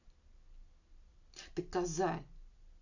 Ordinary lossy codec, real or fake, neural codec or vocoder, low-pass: none; real; none; 7.2 kHz